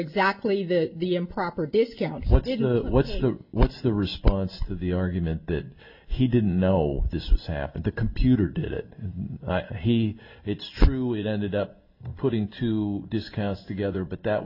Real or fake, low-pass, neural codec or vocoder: real; 5.4 kHz; none